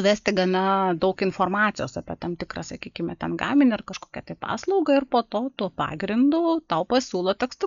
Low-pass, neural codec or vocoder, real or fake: 7.2 kHz; none; real